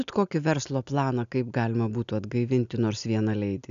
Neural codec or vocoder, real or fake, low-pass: none; real; 7.2 kHz